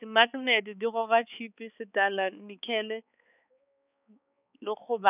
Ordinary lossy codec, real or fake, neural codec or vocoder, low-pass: none; fake; codec, 16 kHz, 4 kbps, X-Codec, HuBERT features, trained on balanced general audio; 3.6 kHz